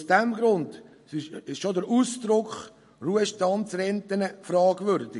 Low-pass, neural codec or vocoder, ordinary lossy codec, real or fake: 14.4 kHz; none; MP3, 48 kbps; real